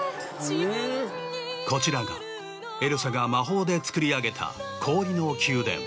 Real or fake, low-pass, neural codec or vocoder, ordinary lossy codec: real; none; none; none